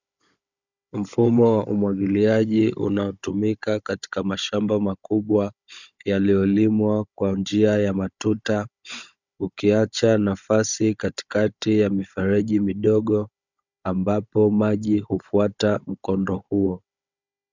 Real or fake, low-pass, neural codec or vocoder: fake; 7.2 kHz; codec, 16 kHz, 16 kbps, FunCodec, trained on Chinese and English, 50 frames a second